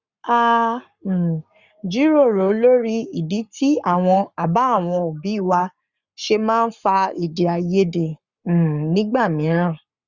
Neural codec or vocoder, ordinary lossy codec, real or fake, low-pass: codec, 44.1 kHz, 7.8 kbps, Pupu-Codec; Opus, 64 kbps; fake; 7.2 kHz